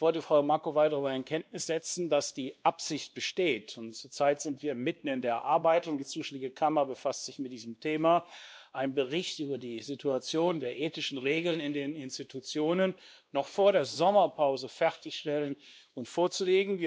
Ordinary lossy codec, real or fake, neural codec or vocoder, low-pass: none; fake; codec, 16 kHz, 2 kbps, X-Codec, WavLM features, trained on Multilingual LibriSpeech; none